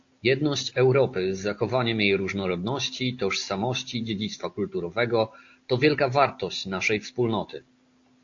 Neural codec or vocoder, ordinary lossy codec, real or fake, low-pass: none; AAC, 48 kbps; real; 7.2 kHz